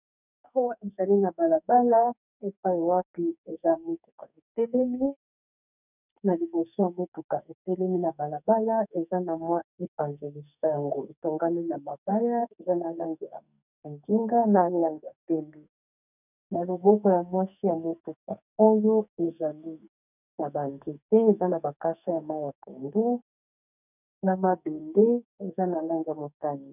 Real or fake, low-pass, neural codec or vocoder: fake; 3.6 kHz; codec, 44.1 kHz, 2.6 kbps, SNAC